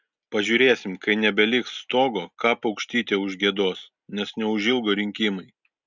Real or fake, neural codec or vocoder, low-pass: real; none; 7.2 kHz